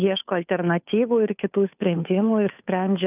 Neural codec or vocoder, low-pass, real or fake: codec, 16 kHz, 2 kbps, FunCodec, trained on Chinese and English, 25 frames a second; 3.6 kHz; fake